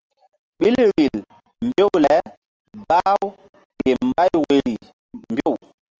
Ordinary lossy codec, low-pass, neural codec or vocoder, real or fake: Opus, 24 kbps; 7.2 kHz; none; real